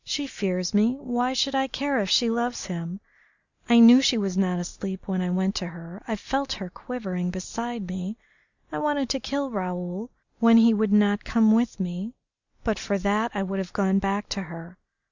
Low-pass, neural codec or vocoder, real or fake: 7.2 kHz; none; real